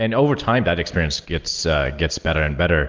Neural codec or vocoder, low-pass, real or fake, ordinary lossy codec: none; 7.2 kHz; real; Opus, 32 kbps